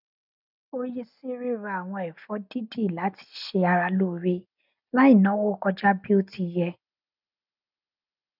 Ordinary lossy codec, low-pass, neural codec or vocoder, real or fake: none; 5.4 kHz; none; real